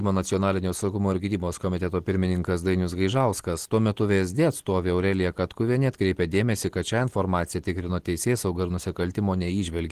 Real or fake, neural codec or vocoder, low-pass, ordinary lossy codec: real; none; 14.4 kHz; Opus, 16 kbps